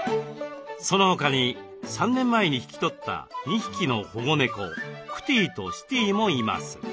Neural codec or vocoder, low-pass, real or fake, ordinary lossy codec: none; none; real; none